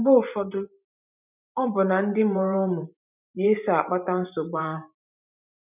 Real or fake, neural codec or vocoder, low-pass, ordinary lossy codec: fake; vocoder, 44.1 kHz, 128 mel bands every 512 samples, BigVGAN v2; 3.6 kHz; none